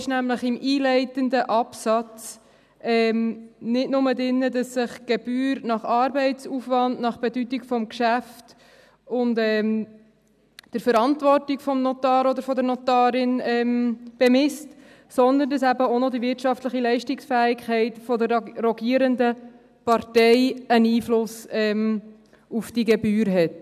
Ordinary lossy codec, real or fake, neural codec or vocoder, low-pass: none; real; none; 14.4 kHz